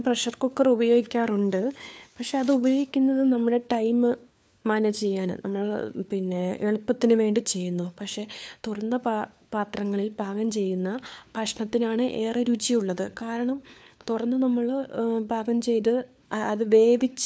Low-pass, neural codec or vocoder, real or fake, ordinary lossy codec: none; codec, 16 kHz, 4 kbps, FunCodec, trained on LibriTTS, 50 frames a second; fake; none